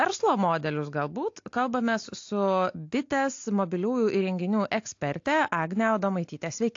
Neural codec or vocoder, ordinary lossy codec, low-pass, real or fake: none; AAC, 48 kbps; 7.2 kHz; real